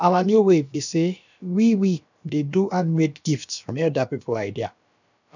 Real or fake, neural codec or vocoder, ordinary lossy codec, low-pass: fake; codec, 16 kHz, about 1 kbps, DyCAST, with the encoder's durations; none; 7.2 kHz